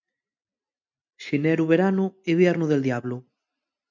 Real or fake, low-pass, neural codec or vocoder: real; 7.2 kHz; none